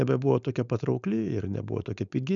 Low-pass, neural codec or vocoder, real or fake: 7.2 kHz; codec, 16 kHz, 4.8 kbps, FACodec; fake